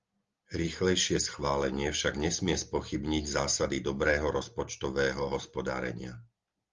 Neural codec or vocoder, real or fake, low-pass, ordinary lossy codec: none; real; 7.2 kHz; Opus, 32 kbps